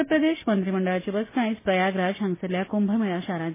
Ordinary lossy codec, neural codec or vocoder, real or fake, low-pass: MP3, 16 kbps; none; real; 3.6 kHz